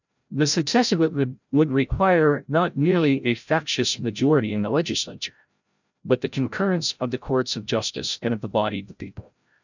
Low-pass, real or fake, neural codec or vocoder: 7.2 kHz; fake; codec, 16 kHz, 0.5 kbps, FreqCodec, larger model